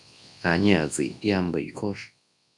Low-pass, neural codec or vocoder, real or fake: 10.8 kHz; codec, 24 kHz, 0.9 kbps, WavTokenizer, large speech release; fake